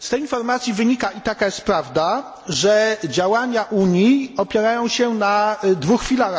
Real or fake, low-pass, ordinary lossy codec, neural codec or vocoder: real; none; none; none